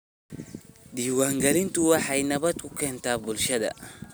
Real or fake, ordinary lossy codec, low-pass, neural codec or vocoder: real; none; none; none